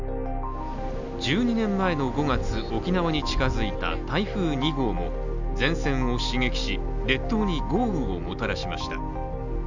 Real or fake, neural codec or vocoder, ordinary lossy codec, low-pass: real; none; none; 7.2 kHz